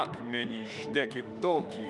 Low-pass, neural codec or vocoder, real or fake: 10.8 kHz; autoencoder, 48 kHz, 32 numbers a frame, DAC-VAE, trained on Japanese speech; fake